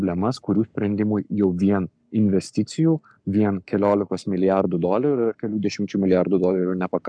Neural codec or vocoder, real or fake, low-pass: codec, 44.1 kHz, 7.8 kbps, Pupu-Codec; fake; 9.9 kHz